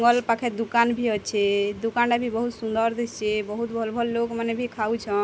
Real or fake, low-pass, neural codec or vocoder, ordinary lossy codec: real; none; none; none